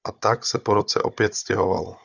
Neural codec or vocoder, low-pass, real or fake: codec, 16 kHz, 16 kbps, FunCodec, trained on Chinese and English, 50 frames a second; 7.2 kHz; fake